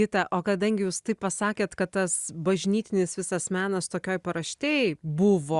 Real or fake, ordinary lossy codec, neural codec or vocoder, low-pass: real; Opus, 64 kbps; none; 10.8 kHz